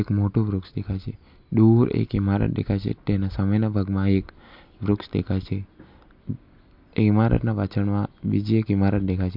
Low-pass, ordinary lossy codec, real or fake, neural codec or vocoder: 5.4 kHz; MP3, 48 kbps; real; none